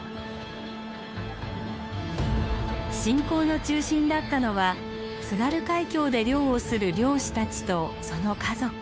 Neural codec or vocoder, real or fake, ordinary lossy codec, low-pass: codec, 16 kHz, 2 kbps, FunCodec, trained on Chinese and English, 25 frames a second; fake; none; none